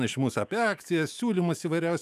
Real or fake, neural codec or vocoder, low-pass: fake; autoencoder, 48 kHz, 128 numbers a frame, DAC-VAE, trained on Japanese speech; 14.4 kHz